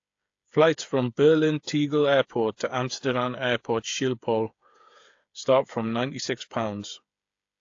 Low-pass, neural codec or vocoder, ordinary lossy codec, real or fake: 7.2 kHz; codec, 16 kHz, 8 kbps, FreqCodec, smaller model; AAC, 48 kbps; fake